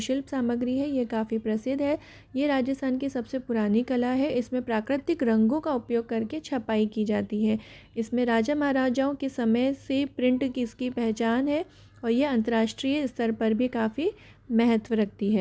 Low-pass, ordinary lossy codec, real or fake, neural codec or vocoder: none; none; real; none